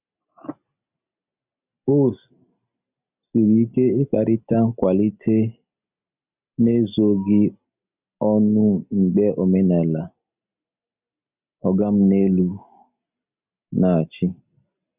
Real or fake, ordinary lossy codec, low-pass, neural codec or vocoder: real; none; 3.6 kHz; none